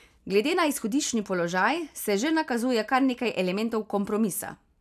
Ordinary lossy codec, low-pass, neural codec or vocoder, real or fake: none; 14.4 kHz; none; real